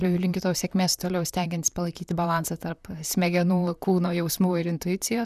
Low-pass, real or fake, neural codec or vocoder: 14.4 kHz; fake; vocoder, 44.1 kHz, 128 mel bands, Pupu-Vocoder